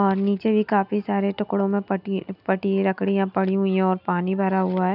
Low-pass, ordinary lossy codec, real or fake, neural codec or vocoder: 5.4 kHz; none; real; none